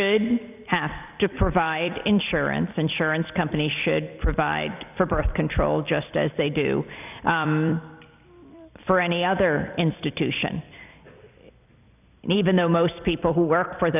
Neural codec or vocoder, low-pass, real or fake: vocoder, 44.1 kHz, 128 mel bands every 256 samples, BigVGAN v2; 3.6 kHz; fake